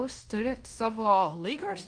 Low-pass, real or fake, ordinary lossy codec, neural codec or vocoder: 9.9 kHz; fake; Opus, 32 kbps; codec, 16 kHz in and 24 kHz out, 0.9 kbps, LongCat-Audio-Codec, fine tuned four codebook decoder